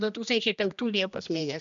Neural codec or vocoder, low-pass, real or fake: codec, 16 kHz, 1 kbps, X-Codec, HuBERT features, trained on general audio; 7.2 kHz; fake